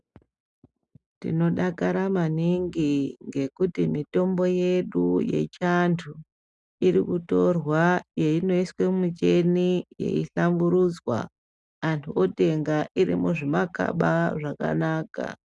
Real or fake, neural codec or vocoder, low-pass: real; none; 10.8 kHz